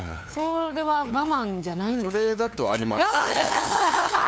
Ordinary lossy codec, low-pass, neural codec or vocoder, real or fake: none; none; codec, 16 kHz, 2 kbps, FunCodec, trained on LibriTTS, 25 frames a second; fake